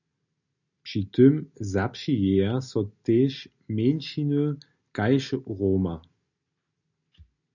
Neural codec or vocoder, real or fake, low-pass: none; real; 7.2 kHz